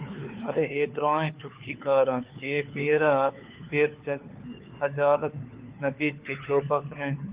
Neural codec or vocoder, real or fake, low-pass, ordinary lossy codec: codec, 16 kHz, 4 kbps, FunCodec, trained on LibriTTS, 50 frames a second; fake; 3.6 kHz; Opus, 32 kbps